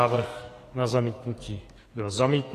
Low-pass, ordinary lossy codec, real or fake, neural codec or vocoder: 14.4 kHz; AAC, 48 kbps; fake; codec, 44.1 kHz, 2.6 kbps, SNAC